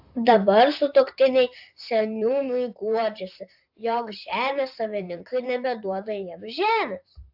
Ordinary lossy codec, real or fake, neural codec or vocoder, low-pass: AAC, 48 kbps; fake; vocoder, 44.1 kHz, 128 mel bands, Pupu-Vocoder; 5.4 kHz